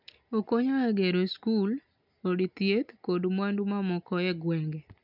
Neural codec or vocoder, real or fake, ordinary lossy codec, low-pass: none; real; none; 5.4 kHz